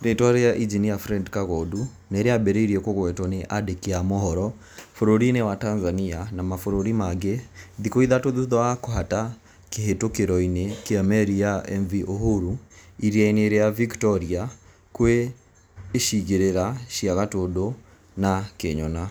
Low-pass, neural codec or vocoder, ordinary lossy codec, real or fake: none; none; none; real